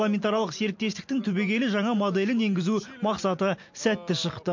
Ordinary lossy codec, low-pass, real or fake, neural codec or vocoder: MP3, 48 kbps; 7.2 kHz; real; none